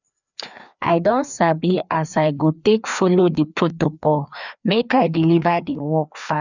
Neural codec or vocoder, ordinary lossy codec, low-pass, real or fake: codec, 16 kHz, 2 kbps, FreqCodec, larger model; none; 7.2 kHz; fake